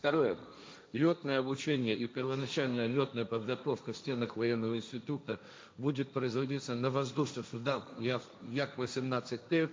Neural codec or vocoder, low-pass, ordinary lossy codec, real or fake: codec, 16 kHz, 1.1 kbps, Voila-Tokenizer; none; none; fake